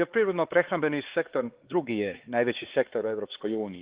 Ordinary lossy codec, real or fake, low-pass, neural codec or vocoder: Opus, 16 kbps; fake; 3.6 kHz; codec, 16 kHz, 4 kbps, X-Codec, HuBERT features, trained on LibriSpeech